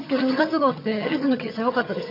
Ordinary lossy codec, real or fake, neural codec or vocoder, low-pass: MP3, 48 kbps; fake; vocoder, 22.05 kHz, 80 mel bands, HiFi-GAN; 5.4 kHz